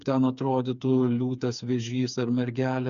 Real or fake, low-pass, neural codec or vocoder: fake; 7.2 kHz; codec, 16 kHz, 4 kbps, FreqCodec, smaller model